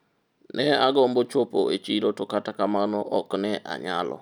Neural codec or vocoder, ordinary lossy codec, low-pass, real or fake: none; none; 19.8 kHz; real